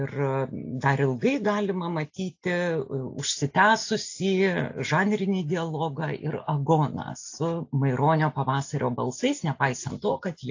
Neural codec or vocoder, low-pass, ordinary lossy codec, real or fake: none; 7.2 kHz; AAC, 48 kbps; real